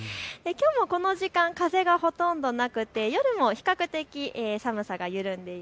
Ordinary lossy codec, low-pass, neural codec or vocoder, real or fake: none; none; none; real